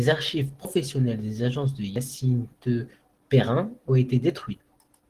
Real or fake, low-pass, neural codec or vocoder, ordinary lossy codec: real; 14.4 kHz; none; Opus, 16 kbps